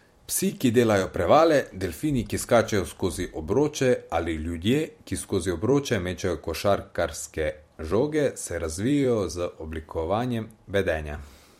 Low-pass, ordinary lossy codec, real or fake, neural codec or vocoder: 19.8 kHz; MP3, 64 kbps; fake; vocoder, 44.1 kHz, 128 mel bands every 256 samples, BigVGAN v2